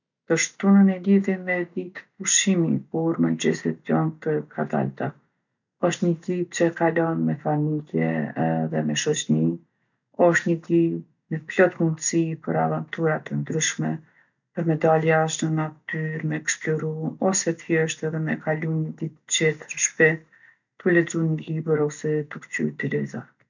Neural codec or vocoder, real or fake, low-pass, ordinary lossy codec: none; real; 7.2 kHz; none